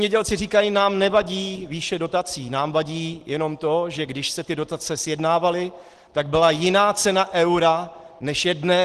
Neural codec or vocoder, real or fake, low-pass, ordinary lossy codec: none; real; 10.8 kHz; Opus, 16 kbps